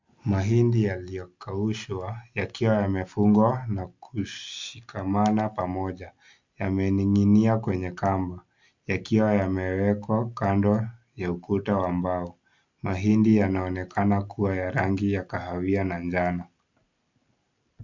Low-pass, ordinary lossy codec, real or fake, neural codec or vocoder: 7.2 kHz; MP3, 64 kbps; real; none